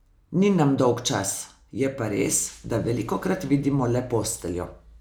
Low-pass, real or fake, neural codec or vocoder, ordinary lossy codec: none; real; none; none